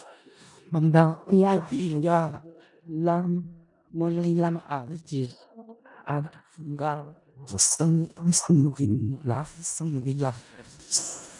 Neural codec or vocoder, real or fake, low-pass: codec, 16 kHz in and 24 kHz out, 0.4 kbps, LongCat-Audio-Codec, four codebook decoder; fake; 10.8 kHz